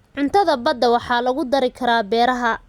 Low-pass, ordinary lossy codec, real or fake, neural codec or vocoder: 19.8 kHz; Opus, 64 kbps; real; none